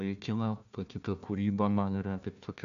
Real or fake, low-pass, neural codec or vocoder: fake; 7.2 kHz; codec, 16 kHz, 1 kbps, FunCodec, trained on Chinese and English, 50 frames a second